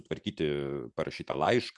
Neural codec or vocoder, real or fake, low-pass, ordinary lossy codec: none; real; 10.8 kHz; MP3, 96 kbps